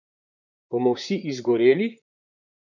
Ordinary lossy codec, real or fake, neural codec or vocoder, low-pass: none; fake; vocoder, 44.1 kHz, 128 mel bands, Pupu-Vocoder; 7.2 kHz